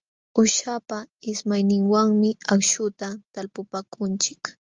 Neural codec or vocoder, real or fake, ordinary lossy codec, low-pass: none; real; Opus, 32 kbps; 7.2 kHz